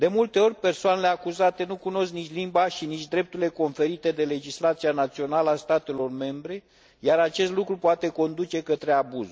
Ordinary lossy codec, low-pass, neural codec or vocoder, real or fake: none; none; none; real